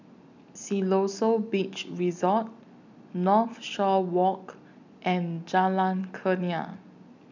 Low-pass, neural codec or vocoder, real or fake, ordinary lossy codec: 7.2 kHz; none; real; none